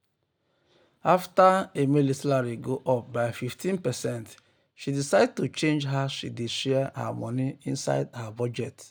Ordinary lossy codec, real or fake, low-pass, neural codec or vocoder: none; real; none; none